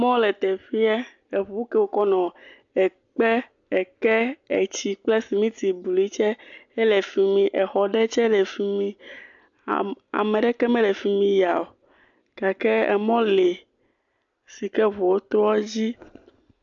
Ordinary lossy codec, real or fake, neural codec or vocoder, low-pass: AAC, 64 kbps; real; none; 7.2 kHz